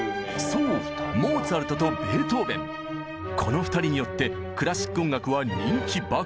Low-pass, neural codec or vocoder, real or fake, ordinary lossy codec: none; none; real; none